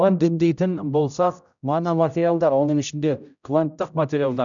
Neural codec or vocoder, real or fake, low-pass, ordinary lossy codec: codec, 16 kHz, 0.5 kbps, X-Codec, HuBERT features, trained on general audio; fake; 7.2 kHz; none